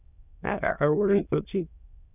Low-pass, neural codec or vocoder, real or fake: 3.6 kHz; autoencoder, 22.05 kHz, a latent of 192 numbers a frame, VITS, trained on many speakers; fake